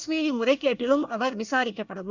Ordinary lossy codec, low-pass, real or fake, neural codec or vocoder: none; 7.2 kHz; fake; codec, 24 kHz, 1 kbps, SNAC